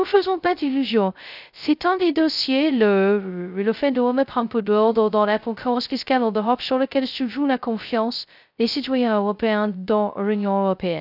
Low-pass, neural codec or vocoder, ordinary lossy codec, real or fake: 5.4 kHz; codec, 16 kHz, 0.2 kbps, FocalCodec; none; fake